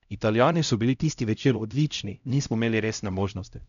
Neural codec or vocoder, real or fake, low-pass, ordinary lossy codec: codec, 16 kHz, 0.5 kbps, X-Codec, HuBERT features, trained on LibriSpeech; fake; 7.2 kHz; none